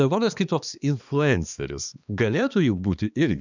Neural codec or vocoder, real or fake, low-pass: codec, 16 kHz, 2 kbps, X-Codec, HuBERT features, trained on balanced general audio; fake; 7.2 kHz